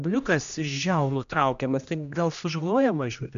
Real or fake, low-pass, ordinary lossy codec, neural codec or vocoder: fake; 7.2 kHz; AAC, 96 kbps; codec, 16 kHz, 1 kbps, X-Codec, HuBERT features, trained on general audio